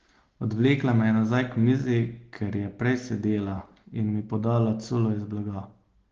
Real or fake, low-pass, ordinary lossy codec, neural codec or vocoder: real; 7.2 kHz; Opus, 16 kbps; none